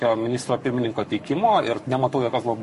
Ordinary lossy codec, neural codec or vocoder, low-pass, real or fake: MP3, 48 kbps; codec, 44.1 kHz, 7.8 kbps, Pupu-Codec; 14.4 kHz; fake